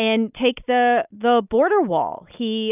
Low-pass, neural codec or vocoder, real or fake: 3.6 kHz; none; real